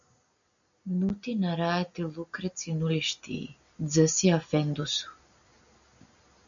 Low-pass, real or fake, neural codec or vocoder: 7.2 kHz; real; none